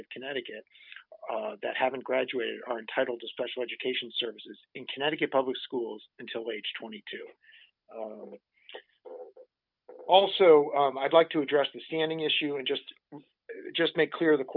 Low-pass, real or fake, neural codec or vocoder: 5.4 kHz; real; none